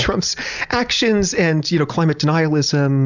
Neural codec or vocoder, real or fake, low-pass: none; real; 7.2 kHz